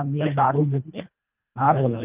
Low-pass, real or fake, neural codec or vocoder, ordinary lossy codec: 3.6 kHz; fake; codec, 24 kHz, 1.5 kbps, HILCodec; Opus, 24 kbps